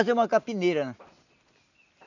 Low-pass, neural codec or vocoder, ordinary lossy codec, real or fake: 7.2 kHz; none; none; real